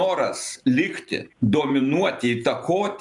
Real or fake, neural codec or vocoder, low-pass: fake; vocoder, 44.1 kHz, 128 mel bands every 512 samples, BigVGAN v2; 10.8 kHz